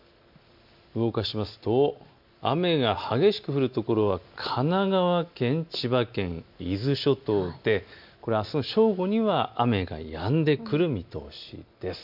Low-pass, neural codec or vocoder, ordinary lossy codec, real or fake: 5.4 kHz; none; MP3, 48 kbps; real